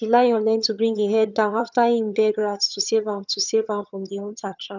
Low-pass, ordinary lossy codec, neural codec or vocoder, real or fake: 7.2 kHz; none; vocoder, 22.05 kHz, 80 mel bands, HiFi-GAN; fake